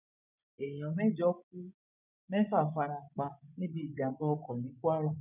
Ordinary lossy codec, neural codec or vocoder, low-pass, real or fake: none; vocoder, 24 kHz, 100 mel bands, Vocos; 3.6 kHz; fake